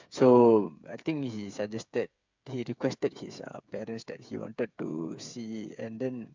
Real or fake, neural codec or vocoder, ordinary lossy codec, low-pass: fake; codec, 16 kHz, 8 kbps, FreqCodec, smaller model; MP3, 64 kbps; 7.2 kHz